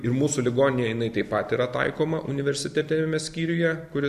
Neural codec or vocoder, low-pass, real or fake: none; 14.4 kHz; real